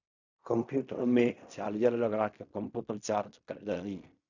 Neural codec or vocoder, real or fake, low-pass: codec, 16 kHz in and 24 kHz out, 0.4 kbps, LongCat-Audio-Codec, fine tuned four codebook decoder; fake; 7.2 kHz